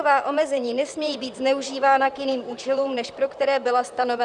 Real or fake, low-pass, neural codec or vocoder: fake; 10.8 kHz; vocoder, 44.1 kHz, 128 mel bands, Pupu-Vocoder